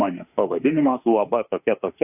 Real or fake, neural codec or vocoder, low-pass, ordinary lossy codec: fake; autoencoder, 48 kHz, 32 numbers a frame, DAC-VAE, trained on Japanese speech; 3.6 kHz; AAC, 32 kbps